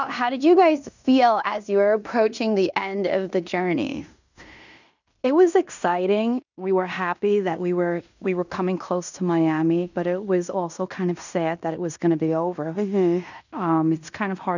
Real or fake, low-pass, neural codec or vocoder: fake; 7.2 kHz; codec, 16 kHz in and 24 kHz out, 0.9 kbps, LongCat-Audio-Codec, fine tuned four codebook decoder